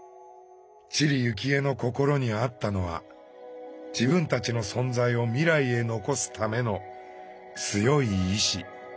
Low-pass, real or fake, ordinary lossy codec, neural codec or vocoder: none; real; none; none